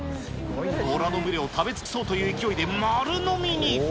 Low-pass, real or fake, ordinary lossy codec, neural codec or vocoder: none; real; none; none